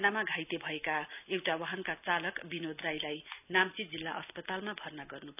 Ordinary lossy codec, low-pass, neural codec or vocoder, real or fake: none; 3.6 kHz; none; real